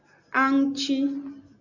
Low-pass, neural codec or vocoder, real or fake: 7.2 kHz; none; real